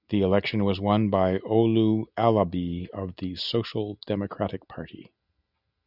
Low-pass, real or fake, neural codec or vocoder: 5.4 kHz; real; none